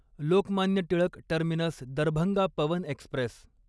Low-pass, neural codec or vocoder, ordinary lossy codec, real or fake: none; none; none; real